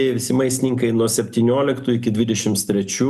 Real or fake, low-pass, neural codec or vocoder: real; 14.4 kHz; none